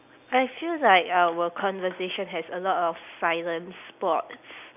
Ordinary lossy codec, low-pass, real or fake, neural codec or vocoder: none; 3.6 kHz; real; none